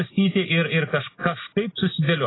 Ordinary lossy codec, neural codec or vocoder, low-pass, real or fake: AAC, 16 kbps; none; 7.2 kHz; real